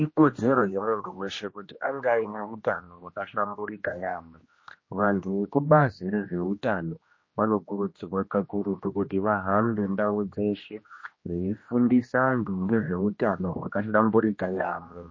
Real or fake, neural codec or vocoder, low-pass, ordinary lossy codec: fake; codec, 16 kHz, 1 kbps, X-Codec, HuBERT features, trained on general audio; 7.2 kHz; MP3, 32 kbps